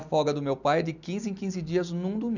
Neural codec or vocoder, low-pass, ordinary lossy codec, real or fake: none; 7.2 kHz; none; real